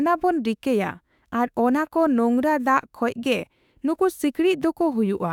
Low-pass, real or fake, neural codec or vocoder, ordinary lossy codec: 19.8 kHz; fake; autoencoder, 48 kHz, 32 numbers a frame, DAC-VAE, trained on Japanese speech; none